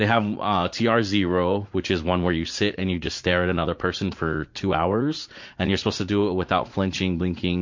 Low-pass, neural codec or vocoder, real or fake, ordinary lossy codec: 7.2 kHz; vocoder, 44.1 kHz, 80 mel bands, Vocos; fake; MP3, 48 kbps